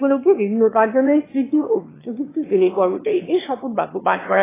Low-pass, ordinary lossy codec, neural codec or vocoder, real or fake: 3.6 kHz; AAC, 16 kbps; autoencoder, 22.05 kHz, a latent of 192 numbers a frame, VITS, trained on one speaker; fake